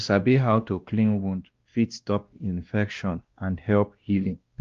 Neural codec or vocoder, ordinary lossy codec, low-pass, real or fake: codec, 16 kHz, 1 kbps, X-Codec, WavLM features, trained on Multilingual LibriSpeech; Opus, 32 kbps; 7.2 kHz; fake